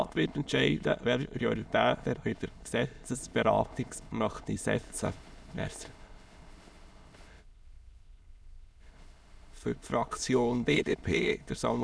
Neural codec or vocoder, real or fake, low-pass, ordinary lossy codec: autoencoder, 22.05 kHz, a latent of 192 numbers a frame, VITS, trained on many speakers; fake; none; none